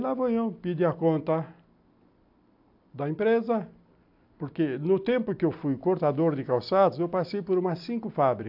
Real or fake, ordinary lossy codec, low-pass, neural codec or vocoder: real; AAC, 48 kbps; 5.4 kHz; none